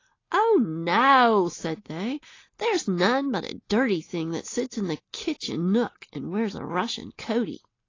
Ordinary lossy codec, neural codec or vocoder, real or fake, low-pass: AAC, 32 kbps; none; real; 7.2 kHz